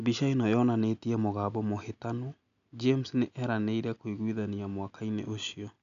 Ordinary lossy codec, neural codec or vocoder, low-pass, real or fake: none; none; 7.2 kHz; real